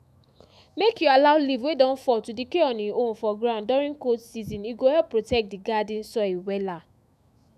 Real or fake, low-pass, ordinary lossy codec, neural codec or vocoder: fake; 14.4 kHz; none; autoencoder, 48 kHz, 128 numbers a frame, DAC-VAE, trained on Japanese speech